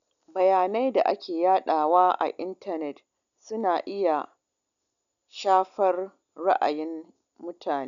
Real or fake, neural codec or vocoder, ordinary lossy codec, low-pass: real; none; none; 7.2 kHz